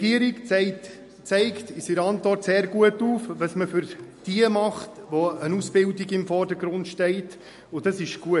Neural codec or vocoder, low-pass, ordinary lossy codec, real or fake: none; 14.4 kHz; MP3, 48 kbps; real